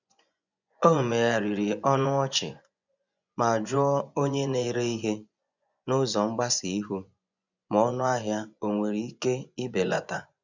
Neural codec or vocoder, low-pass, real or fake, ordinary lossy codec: vocoder, 24 kHz, 100 mel bands, Vocos; 7.2 kHz; fake; none